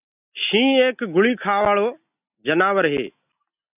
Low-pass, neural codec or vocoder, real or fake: 3.6 kHz; none; real